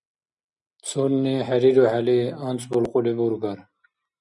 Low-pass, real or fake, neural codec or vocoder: 10.8 kHz; real; none